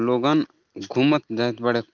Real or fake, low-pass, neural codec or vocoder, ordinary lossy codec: real; 7.2 kHz; none; Opus, 32 kbps